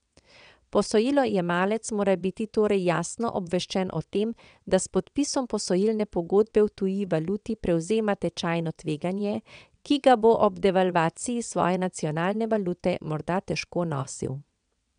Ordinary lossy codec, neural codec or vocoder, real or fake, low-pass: none; vocoder, 22.05 kHz, 80 mel bands, WaveNeXt; fake; 9.9 kHz